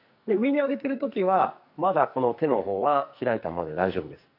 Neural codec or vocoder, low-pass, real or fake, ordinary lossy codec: codec, 44.1 kHz, 2.6 kbps, SNAC; 5.4 kHz; fake; none